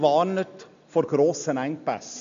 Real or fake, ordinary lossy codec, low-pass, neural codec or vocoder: real; MP3, 48 kbps; 7.2 kHz; none